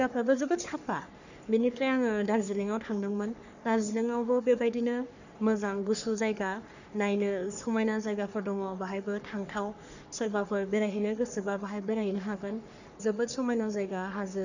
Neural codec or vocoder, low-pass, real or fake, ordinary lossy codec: codec, 44.1 kHz, 3.4 kbps, Pupu-Codec; 7.2 kHz; fake; none